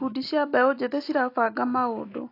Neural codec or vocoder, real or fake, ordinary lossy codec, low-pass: none; real; AAC, 32 kbps; 5.4 kHz